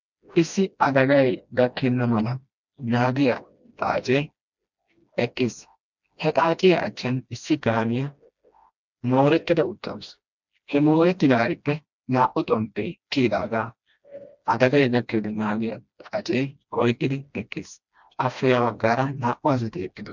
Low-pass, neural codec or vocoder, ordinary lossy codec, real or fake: 7.2 kHz; codec, 16 kHz, 1 kbps, FreqCodec, smaller model; MP3, 64 kbps; fake